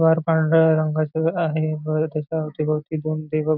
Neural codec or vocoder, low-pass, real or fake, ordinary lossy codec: none; 5.4 kHz; real; none